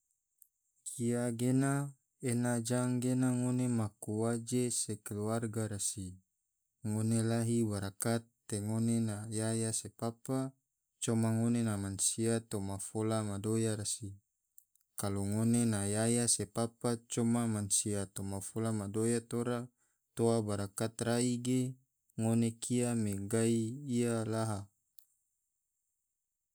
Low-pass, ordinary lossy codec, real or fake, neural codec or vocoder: none; none; real; none